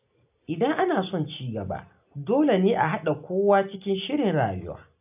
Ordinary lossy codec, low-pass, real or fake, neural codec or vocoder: AAC, 32 kbps; 3.6 kHz; real; none